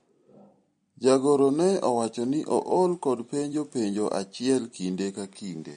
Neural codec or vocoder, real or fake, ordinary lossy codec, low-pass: none; real; MP3, 48 kbps; 9.9 kHz